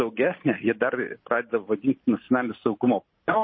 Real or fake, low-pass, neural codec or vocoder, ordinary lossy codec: real; 7.2 kHz; none; MP3, 24 kbps